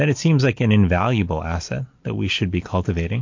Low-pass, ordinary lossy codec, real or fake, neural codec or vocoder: 7.2 kHz; MP3, 48 kbps; real; none